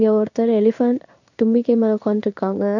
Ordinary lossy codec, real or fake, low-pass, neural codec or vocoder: none; fake; 7.2 kHz; codec, 16 kHz in and 24 kHz out, 1 kbps, XY-Tokenizer